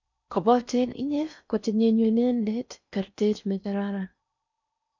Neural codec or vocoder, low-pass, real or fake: codec, 16 kHz in and 24 kHz out, 0.6 kbps, FocalCodec, streaming, 4096 codes; 7.2 kHz; fake